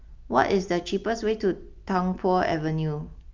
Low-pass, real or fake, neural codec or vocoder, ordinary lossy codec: 7.2 kHz; real; none; Opus, 24 kbps